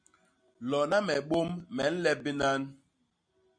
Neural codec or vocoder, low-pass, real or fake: none; 9.9 kHz; real